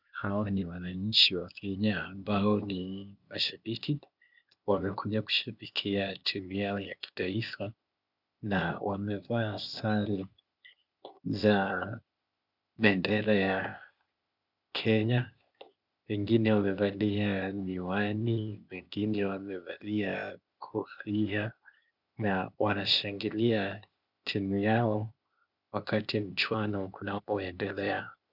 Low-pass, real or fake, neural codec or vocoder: 5.4 kHz; fake; codec, 16 kHz, 0.8 kbps, ZipCodec